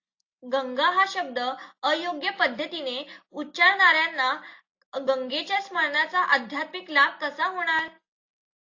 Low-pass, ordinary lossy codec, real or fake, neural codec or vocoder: 7.2 kHz; AAC, 48 kbps; real; none